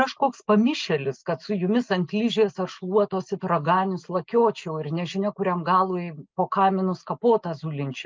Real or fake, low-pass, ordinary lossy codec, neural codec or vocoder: real; 7.2 kHz; Opus, 24 kbps; none